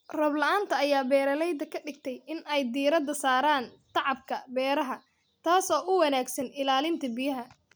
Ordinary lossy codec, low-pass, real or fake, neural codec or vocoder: none; none; real; none